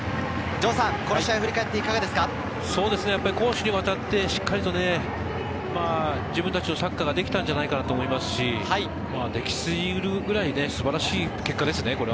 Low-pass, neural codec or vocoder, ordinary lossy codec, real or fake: none; none; none; real